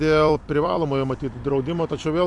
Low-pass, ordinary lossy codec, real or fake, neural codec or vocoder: 10.8 kHz; MP3, 64 kbps; fake; vocoder, 44.1 kHz, 128 mel bands every 256 samples, BigVGAN v2